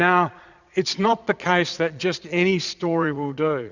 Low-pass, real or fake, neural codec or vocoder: 7.2 kHz; fake; vocoder, 22.05 kHz, 80 mel bands, Vocos